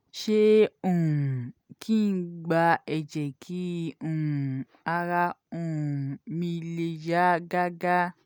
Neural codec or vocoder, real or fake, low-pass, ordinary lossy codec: none; real; none; none